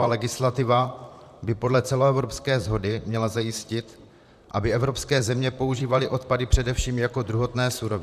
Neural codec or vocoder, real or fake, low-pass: vocoder, 44.1 kHz, 128 mel bands, Pupu-Vocoder; fake; 14.4 kHz